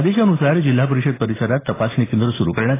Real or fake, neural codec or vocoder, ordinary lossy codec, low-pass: real; none; AAC, 16 kbps; 3.6 kHz